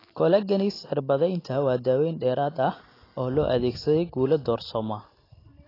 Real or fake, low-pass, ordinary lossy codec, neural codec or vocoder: real; 5.4 kHz; AAC, 24 kbps; none